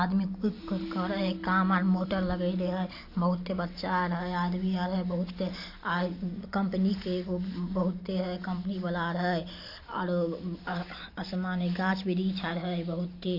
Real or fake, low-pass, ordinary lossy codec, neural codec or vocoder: real; 5.4 kHz; AAC, 32 kbps; none